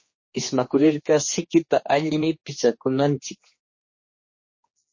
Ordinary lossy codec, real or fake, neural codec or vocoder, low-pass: MP3, 32 kbps; fake; codec, 16 kHz, 2 kbps, X-Codec, HuBERT features, trained on general audio; 7.2 kHz